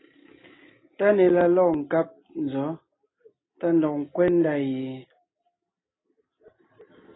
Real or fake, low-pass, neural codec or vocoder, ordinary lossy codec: real; 7.2 kHz; none; AAC, 16 kbps